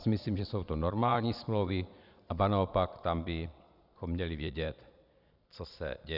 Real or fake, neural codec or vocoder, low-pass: fake; vocoder, 22.05 kHz, 80 mel bands, Vocos; 5.4 kHz